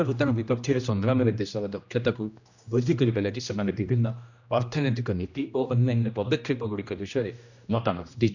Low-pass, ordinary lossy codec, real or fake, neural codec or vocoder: 7.2 kHz; none; fake; codec, 16 kHz, 1 kbps, X-Codec, HuBERT features, trained on general audio